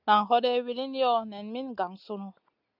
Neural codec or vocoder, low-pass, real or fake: none; 5.4 kHz; real